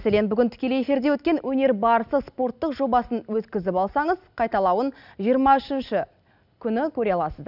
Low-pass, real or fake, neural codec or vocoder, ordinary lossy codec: 5.4 kHz; real; none; none